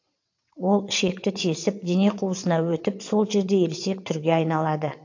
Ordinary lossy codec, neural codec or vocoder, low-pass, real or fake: AAC, 48 kbps; vocoder, 22.05 kHz, 80 mel bands, WaveNeXt; 7.2 kHz; fake